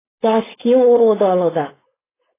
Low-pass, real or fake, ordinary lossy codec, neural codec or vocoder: 3.6 kHz; fake; AAC, 16 kbps; codec, 16 kHz, 4.8 kbps, FACodec